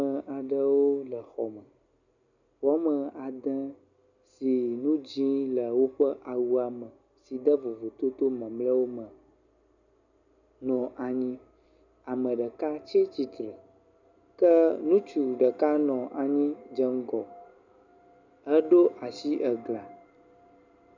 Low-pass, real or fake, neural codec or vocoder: 7.2 kHz; real; none